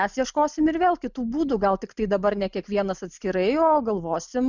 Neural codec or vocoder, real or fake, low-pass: none; real; 7.2 kHz